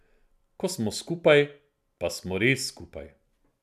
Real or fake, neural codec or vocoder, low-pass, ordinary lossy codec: real; none; 14.4 kHz; none